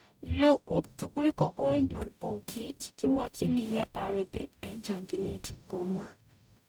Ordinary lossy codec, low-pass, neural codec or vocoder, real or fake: none; none; codec, 44.1 kHz, 0.9 kbps, DAC; fake